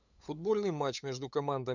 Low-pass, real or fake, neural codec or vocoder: 7.2 kHz; fake; codec, 16 kHz, 8 kbps, FunCodec, trained on LibriTTS, 25 frames a second